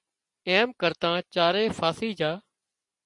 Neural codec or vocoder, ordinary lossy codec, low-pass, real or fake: none; MP3, 64 kbps; 10.8 kHz; real